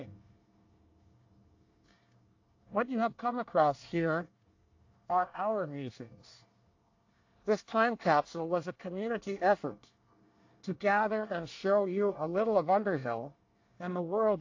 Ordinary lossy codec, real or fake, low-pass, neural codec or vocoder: AAC, 48 kbps; fake; 7.2 kHz; codec, 24 kHz, 1 kbps, SNAC